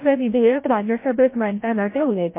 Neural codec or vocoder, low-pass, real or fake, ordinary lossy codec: codec, 16 kHz, 0.5 kbps, FreqCodec, larger model; 3.6 kHz; fake; MP3, 24 kbps